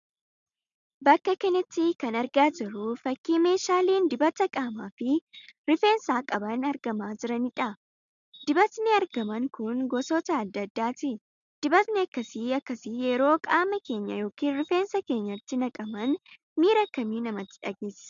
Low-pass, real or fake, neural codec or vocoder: 7.2 kHz; real; none